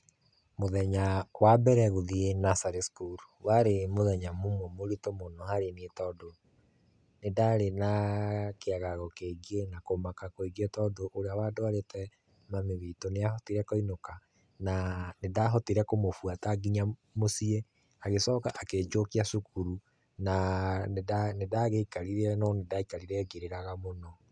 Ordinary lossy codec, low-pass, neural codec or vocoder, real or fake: none; 9.9 kHz; none; real